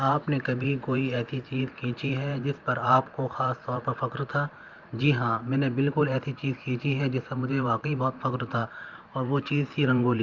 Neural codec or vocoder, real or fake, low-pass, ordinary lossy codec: vocoder, 44.1 kHz, 128 mel bands every 512 samples, BigVGAN v2; fake; 7.2 kHz; Opus, 24 kbps